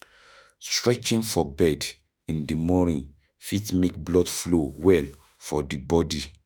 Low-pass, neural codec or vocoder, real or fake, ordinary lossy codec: none; autoencoder, 48 kHz, 32 numbers a frame, DAC-VAE, trained on Japanese speech; fake; none